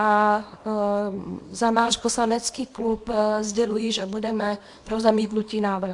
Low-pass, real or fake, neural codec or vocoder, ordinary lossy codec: 10.8 kHz; fake; codec, 24 kHz, 0.9 kbps, WavTokenizer, small release; AAC, 64 kbps